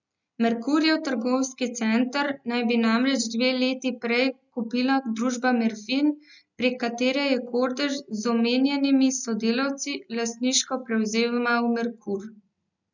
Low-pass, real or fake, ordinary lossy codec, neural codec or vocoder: 7.2 kHz; real; none; none